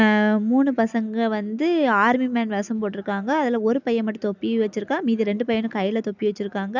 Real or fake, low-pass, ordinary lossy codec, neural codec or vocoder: real; 7.2 kHz; none; none